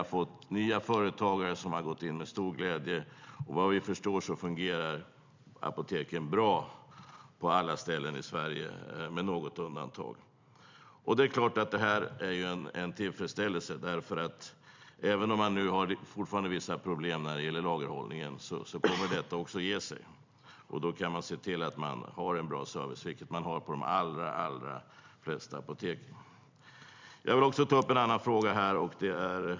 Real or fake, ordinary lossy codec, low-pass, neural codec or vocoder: real; MP3, 64 kbps; 7.2 kHz; none